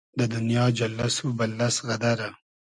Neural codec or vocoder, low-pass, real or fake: none; 10.8 kHz; real